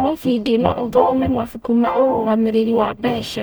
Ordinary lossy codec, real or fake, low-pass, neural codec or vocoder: none; fake; none; codec, 44.1 kHz, 0.9 kbps, DAC